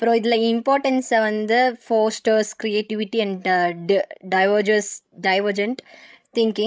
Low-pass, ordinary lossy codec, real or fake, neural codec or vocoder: none; none; fake; codec, 16 kHz, 16 kbps, FreqCodec, larger model